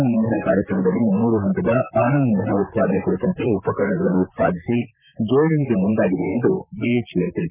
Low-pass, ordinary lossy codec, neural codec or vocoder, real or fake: 3.6 kHz; none; vocoder, 44.1 kHz, 128 mel bands, Pupu-Vocoder; fake